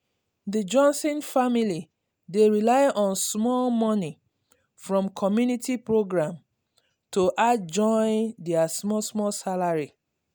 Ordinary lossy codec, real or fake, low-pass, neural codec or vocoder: none; real; none; none